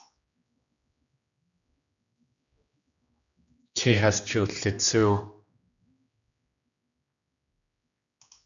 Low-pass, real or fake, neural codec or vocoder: 7.2 kHz; fake; codec, 16 kHz, 2 kbps, X-Codec, HuBERT features, trained on general audio